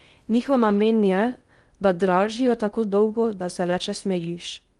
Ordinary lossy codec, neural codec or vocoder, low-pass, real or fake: Opus, 32 kbps; codec, 16 kHz in and 24 kHz out, 0.6 kbps, FocalCodec, streaming, 2048 codes; 10.8 kHz; fake